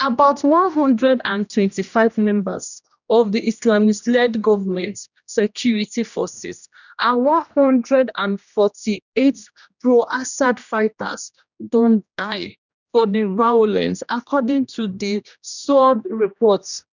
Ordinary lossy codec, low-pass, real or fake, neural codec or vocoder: none; 7.2 kHz; fake; codec, 16 kHz, 1 kbps, X-Codec, HuBERT features, trained on general audio